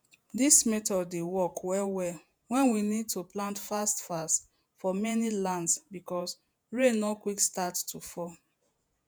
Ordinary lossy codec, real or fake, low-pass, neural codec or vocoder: none; fake; none; vocoder, 48 kHz, 128 mel bands, Vocos